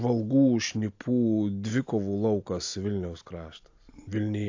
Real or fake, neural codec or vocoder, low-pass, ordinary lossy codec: real; none; 7.2 kHz; AAC, 48 kbps